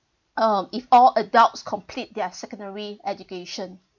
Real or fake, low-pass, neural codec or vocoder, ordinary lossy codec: real; 7.2 kHz; none; none